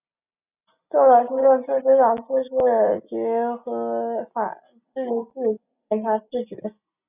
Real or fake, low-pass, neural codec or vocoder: real; 3.6 kHz; none